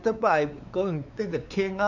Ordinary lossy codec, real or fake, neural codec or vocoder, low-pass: none; fake; codec, 16 kHz, 4 kbps, X-Codec, WavLM features, trained on Multilingual LibriSpeech; 7.2 kHz